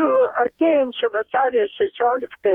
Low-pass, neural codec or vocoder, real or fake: 19.8 kHz; codec, 44.1 kHz, 2.6 kbps, DAC; fake